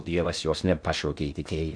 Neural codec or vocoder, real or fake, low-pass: codec, 16 kHz in and 24 kHz out, 0.6 kbps, FocalCodec, streaming, 2048 codes; fake; 9.9 kHz